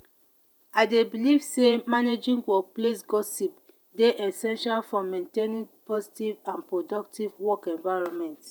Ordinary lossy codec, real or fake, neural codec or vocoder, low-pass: none; fake; vocoder, 48 kHz, 128 mel bands, Vocos; none